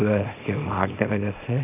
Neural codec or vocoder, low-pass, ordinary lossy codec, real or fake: codec, 24 kHz, 0.9 kbps, WavTokenizer, small release; 3.6 kHz; none; fake